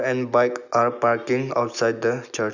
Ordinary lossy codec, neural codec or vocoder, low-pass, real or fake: none; none; 7.2 kHz; real